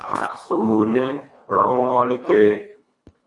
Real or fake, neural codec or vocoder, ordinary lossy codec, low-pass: fake; codec, 24 kHz, 1.5 kbps, HILCodec; AAC, 64 kbps; 10.8 kHz